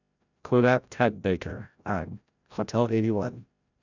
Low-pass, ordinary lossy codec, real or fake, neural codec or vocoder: 7.2 kHz; none; fake; codec, 16 kHz, 0.5 kbps, FreqCodec, larger model